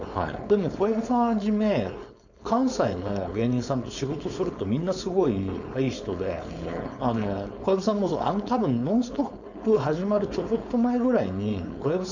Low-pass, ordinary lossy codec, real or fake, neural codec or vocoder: 7.2 kHz; none; fake; codec, 16 kHz, 4.8 kbps, FACodec